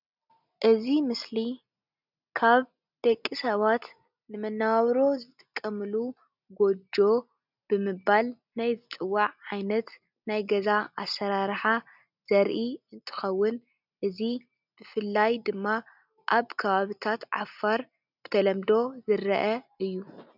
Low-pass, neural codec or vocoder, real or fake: 5.4 kHz; none; real